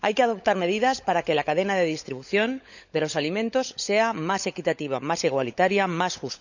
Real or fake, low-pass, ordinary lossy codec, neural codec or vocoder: fake; 7.2 kHz; none; codec, 16 kHz, 16 kbps, FunCodec, trained on LibriTTS, 50 frames a second